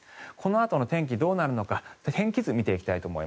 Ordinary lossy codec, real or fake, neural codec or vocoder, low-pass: none; real; none; none